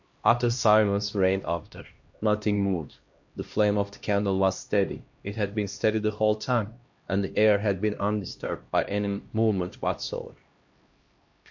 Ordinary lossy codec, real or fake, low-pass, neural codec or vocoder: MP3, 48 kbps; fake; 7.2 kHz; codec, 16 kHz, 1 kbps, X-Codec, HuBERT features, trained on LibriSpeech